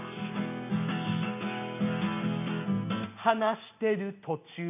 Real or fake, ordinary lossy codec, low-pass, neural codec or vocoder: real; none; 3.6 kHz; none